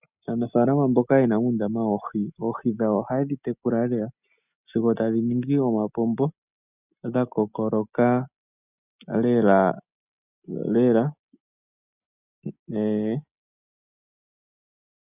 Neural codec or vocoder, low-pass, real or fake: none; 3.6 kHz; real